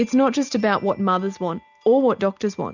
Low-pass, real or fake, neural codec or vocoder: 7.2 kHz; real; none